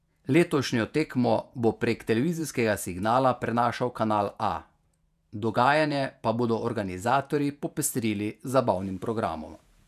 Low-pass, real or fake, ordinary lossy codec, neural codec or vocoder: 14.4 kHz; fake; none; vocoder, 48 kHz, 128 mel bands, Vocos